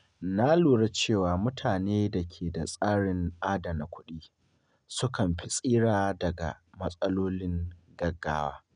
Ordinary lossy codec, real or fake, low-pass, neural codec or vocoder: none; real; 9.9 kHz; none